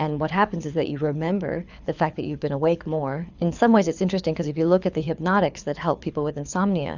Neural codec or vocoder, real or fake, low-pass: codec, 24 kHz, 6 kbps, HILCodec; fake; 7.2 kHz